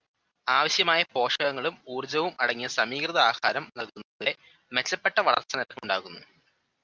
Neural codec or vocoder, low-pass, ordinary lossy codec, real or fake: none; 7.2 kHz; Opus, 32 kbps; real